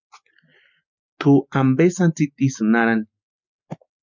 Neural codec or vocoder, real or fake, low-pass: none; real; 7.2 kHz